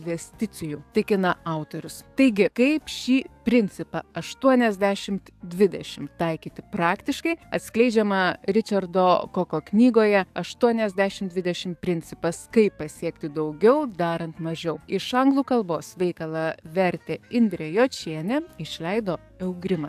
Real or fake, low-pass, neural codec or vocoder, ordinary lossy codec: fake; 14.4 kHz; codec, 44.1 kHz, 7.8 kbps, DAC; AAC, 96 kbps